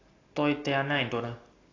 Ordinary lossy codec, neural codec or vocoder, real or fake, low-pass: AAC, 32 kbps; none; real; 7.2 kHz